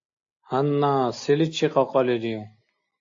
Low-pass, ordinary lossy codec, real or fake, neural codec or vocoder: 7.2 kHz; AAC, 48 kbps; real; none